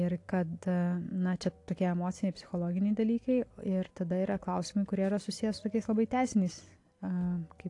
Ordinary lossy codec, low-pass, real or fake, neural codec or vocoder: AAC, 48 kbps; 10.8 kHz; real; none